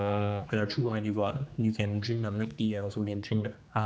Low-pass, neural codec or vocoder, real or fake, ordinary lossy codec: none; codec, 16 kHz, 2 kbps, X-Codec, HuBERT features, trained on general audio; fake; none